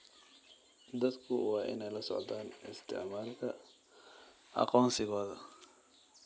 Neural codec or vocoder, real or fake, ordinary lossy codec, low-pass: none; real; none; none